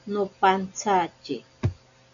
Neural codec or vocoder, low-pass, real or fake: none; 7.2 kHz; real